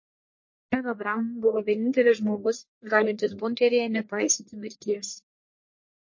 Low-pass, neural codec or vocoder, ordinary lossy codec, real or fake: 7.2 kHz; codec, 44.1 kHz, 1.7 kbps, Pupu-Codec; MP3, 32 kbps; fake